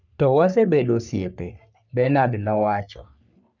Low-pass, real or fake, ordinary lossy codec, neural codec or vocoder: 7.2 kHz; fake; none; codec, 44.1 kHz, 3.4 kbps, Pupu-Codec